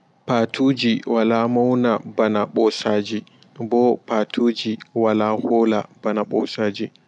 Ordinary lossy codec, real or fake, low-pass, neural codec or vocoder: none; real; 10.8 kHz; none